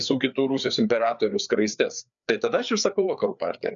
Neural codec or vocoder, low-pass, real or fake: codec, 16 kHz, 4 kbps, FreqCodec, larger model; 7.2 kHz; fake